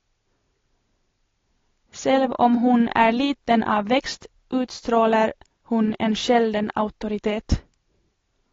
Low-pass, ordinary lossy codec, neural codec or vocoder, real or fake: 7.2 kHz; AAC, 32 kbps; none; real